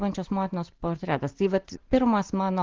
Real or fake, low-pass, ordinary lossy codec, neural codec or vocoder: real; 7.2 kHz; Opus, 16 kbps; none